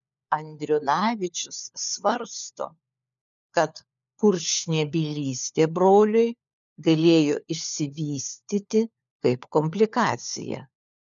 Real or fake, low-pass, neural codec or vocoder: fake; 7.2 kHz; codec, 16 kHz, 4 kbps, FunCodec, trained on LibriTTS, 50 frames a second